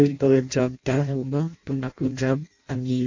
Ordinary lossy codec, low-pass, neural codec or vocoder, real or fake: AAC, 48 kbps; 7.2 kHz; codec, 16 kHz in and 24 kHz out, 0.6 kbps, FireRedTTS-2 codec; fake